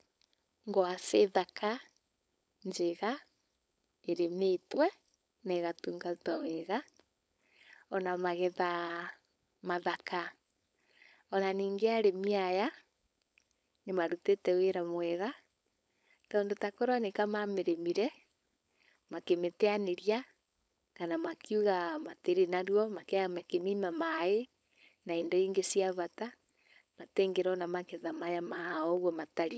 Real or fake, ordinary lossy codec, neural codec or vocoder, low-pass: fake; none; codec, 16 kHz, 4.8 kbps, FACodec; none